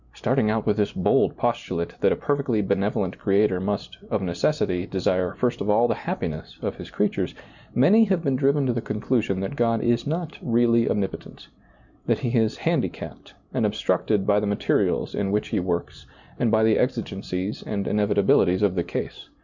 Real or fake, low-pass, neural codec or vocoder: real; 7.2 kHz; none